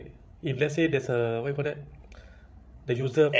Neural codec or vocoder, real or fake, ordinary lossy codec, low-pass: codec, 16 kHz, 16 kbps, FreqCodec, larger model; fake; none; none